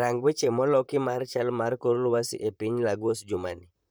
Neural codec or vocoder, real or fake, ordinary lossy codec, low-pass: vocoder, 44.1 kHz, 128 mel bands, Pupu-Vocoder; fake; none; none